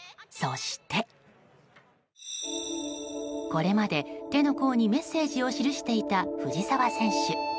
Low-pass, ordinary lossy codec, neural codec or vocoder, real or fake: none; none; none; real